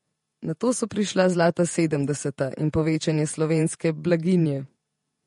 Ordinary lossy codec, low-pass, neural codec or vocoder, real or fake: MP3, 48 kbps; 19.8 kHz; vocoder, 48 kHz, 128 mel bands, Vocos; fake